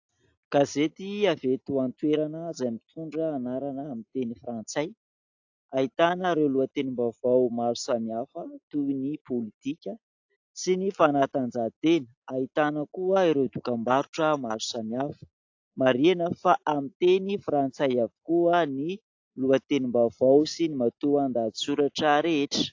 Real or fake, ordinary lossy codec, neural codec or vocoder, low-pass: real; AAC, 48 kbps; none; 7.2 kHz